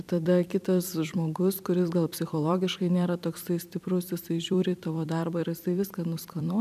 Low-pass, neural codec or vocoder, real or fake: 14.4 kHz; vocoder, 44.1 kHz, 128 mel bands every 256 samples, BigVGAN v2; fake